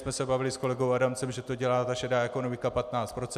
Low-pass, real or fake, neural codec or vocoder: 14.4 kHz; real; none